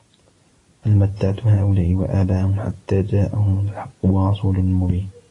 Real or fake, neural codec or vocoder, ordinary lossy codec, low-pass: real; none; AAC, 32 kbps; 10.8 kHz